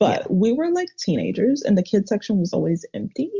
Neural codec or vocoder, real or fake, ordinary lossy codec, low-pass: none; real; Opus, 64 kbps; 7.2 kHz